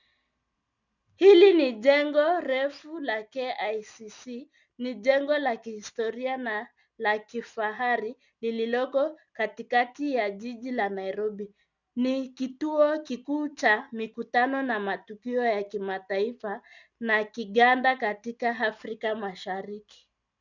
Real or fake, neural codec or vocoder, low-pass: real; none; 7.2 kHz